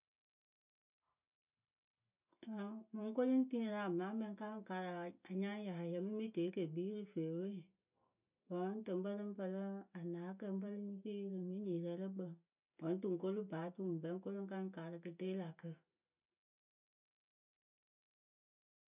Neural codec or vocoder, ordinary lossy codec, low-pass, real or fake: none; none; 3.6 kHz; real